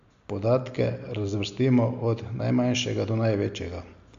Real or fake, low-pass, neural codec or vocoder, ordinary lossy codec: real; 7.2 kHz; none; AAC, 96 kbps